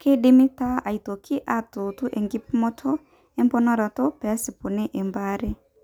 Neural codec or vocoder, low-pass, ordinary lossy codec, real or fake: none; 19.8 kHz; none; real